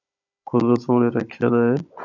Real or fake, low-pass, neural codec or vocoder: fake; 7.2 kHz; codec, 16 kHz, 16 kbps, FunCodec, trained on Chinese and English, 50 frames a second